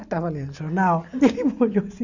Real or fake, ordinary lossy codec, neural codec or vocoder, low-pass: real; none; none; 7.2 kHz